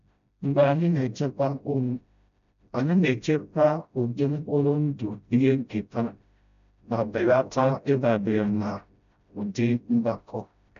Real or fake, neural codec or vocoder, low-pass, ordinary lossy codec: fake; codec, 16 kHz, 0.5 kbps, FreqCodec, smaller model; 7.2 kHz; none